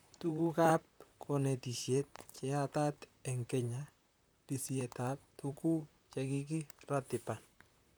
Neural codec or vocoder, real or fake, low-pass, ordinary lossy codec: vocoder, 44.1 kHz, 128 mel bands, Pupu-Vocoder; fake; none; none